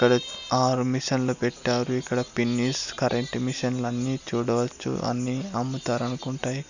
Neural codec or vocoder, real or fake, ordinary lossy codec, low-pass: none; real; none; 7.2 kHz